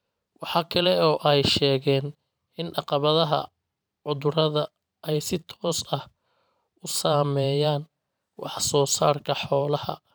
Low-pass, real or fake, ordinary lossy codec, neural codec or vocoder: none; fake; none; vocoder, 44.1 kHz, 128 mel bands every 512 samples, BigVGAN v2